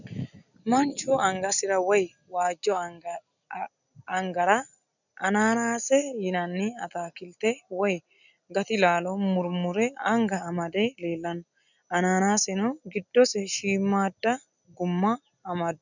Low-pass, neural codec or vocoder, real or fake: 7.2 kHz; none; real